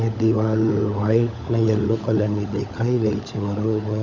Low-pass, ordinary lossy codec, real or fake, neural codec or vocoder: 7.2 kHz; none; fake; codec, 16 kHz, 16 kbps, FunCodec, trained on LibriTTS, 50 frames a second